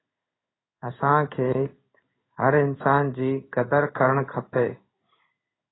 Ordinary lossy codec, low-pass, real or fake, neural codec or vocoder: AAC, 16 kbps; 7.2 kHz; fake; codec, 16 kHz in and 24 kHz out, 1 kbps, XY-Tokenizer